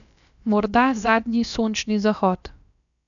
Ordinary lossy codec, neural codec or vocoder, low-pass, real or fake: none; codec, 16 kHz, about 1 kbps, DyCAST, with the encoder's durations; 7.2 kHz; fake